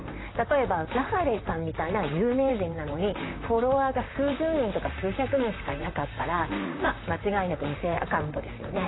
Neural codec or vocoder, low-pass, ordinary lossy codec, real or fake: vocoder, 44.1 kHz, 128 mel bands, Pupu-Vocoder; 7.2 kHz; AAC, 16 kbps; fake